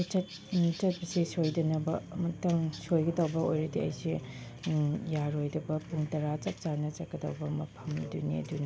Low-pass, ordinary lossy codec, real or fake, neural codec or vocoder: none; none; real; none